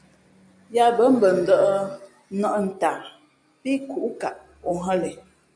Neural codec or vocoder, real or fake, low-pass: none; real; 9.9 kHz